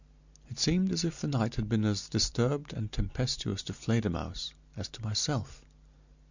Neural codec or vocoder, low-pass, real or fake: none; 7.2 kHz; real